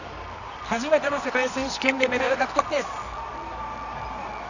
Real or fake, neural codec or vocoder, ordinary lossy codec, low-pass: fake; codec, 24 kHz, 0.9 kbps, WavTokenizer, medium music audio release; none; 7.2 kHz